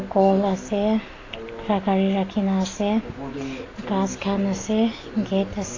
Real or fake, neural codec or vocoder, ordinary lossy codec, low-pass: real; none; AAC, 32 kbps; 7.2 kHz